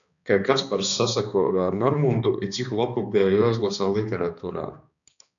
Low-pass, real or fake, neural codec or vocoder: 7.2 kHz; fake; codec, 16 kHz, 2 kbps, X-Codec, HuBERT features, trained on balanced general audio